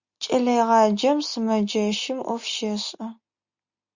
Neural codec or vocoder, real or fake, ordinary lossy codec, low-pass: none; real; Opus, 64 kbps; 7.2 kHz